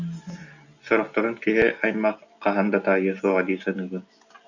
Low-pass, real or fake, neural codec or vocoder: 7.2 kHz; real; none